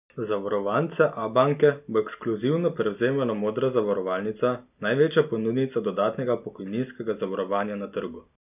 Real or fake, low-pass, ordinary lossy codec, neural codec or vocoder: real; 3.6 kHz; none; none